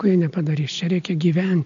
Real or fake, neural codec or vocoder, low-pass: real; none; 7.2 kHz